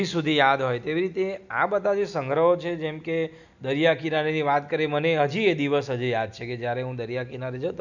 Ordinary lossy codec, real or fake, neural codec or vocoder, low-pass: none; real; none; 7.2 kHz